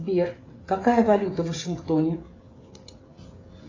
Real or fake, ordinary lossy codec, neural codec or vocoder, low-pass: fake; AAC, 32 kbps; codec, 16 kHz, 16 kbps, FreqCodec, smaller model; 7.2 kHz